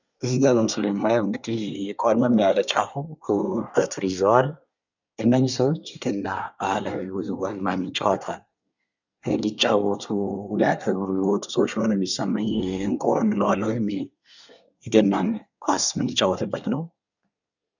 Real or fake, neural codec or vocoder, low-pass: fake; codec, 24 kHz, 1 kbps, SNAC; 7.2 kHz